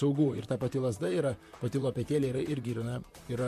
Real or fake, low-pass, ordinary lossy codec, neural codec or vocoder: fake; 14.4 kHz; MP3, 64 kbps; vocoder, 44.1 kHz, 128 mel bands, Pupu-Vocoder